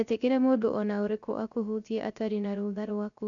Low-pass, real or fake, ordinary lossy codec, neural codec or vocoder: 7.2 kHz; fake; none; codec, 16 kHz, 0.3 kbps, FocalCodec